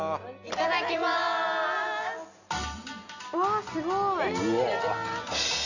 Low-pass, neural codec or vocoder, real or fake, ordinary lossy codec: 7.2 kHz; none; real; none